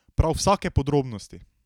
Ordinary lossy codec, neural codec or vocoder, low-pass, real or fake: none; none; 19.8 kHz; real